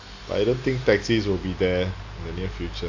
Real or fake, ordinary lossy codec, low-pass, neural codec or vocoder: real; AAC, 48 kbps; 7.2 kHz; none